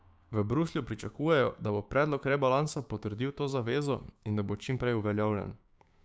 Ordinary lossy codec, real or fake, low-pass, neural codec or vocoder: none; fake; none; codec, 16 kHz, 6 kbps, DAC